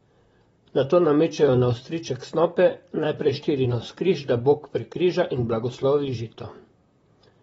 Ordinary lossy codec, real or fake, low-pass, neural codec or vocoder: AAC, 24 kbps; fake; 19.8 kHz; vocoder, 44.1 kHz, 128 mel bands, Pupu-Vocoder